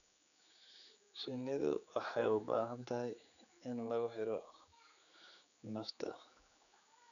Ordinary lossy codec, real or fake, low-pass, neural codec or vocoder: none; fake; 7.2 kHz; codec, 16 kHz, 4 kbps, X-Codec, HuBERT features, trained on general audio